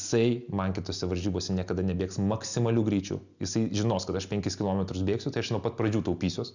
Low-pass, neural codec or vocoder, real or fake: 7.2 kHz; none; real